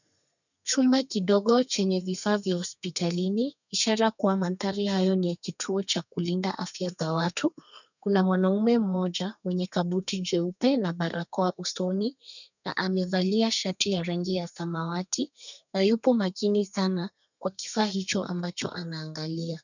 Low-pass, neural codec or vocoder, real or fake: 7.2 kHz; codec, 32 kHz, 1.9 kbps, SNAC; fake